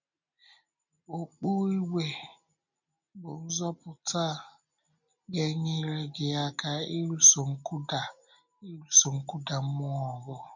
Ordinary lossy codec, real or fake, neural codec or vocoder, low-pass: none; real; none; 7.2 kHz